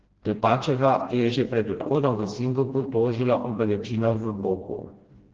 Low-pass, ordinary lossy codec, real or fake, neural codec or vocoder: 7.2 kHz; Opus, 16 kbps; fake; codec, 16 kHz, 1 kbps, FreqCodec, smaller model